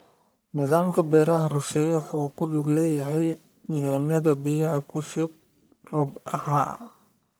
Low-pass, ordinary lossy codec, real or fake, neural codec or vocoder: none; none; fake; codec, 44.1 kHz, 1.7 kbps, Pupu-Codec